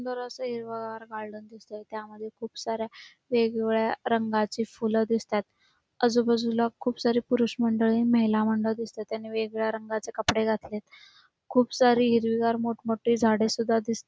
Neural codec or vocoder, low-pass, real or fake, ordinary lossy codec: none; none; real; none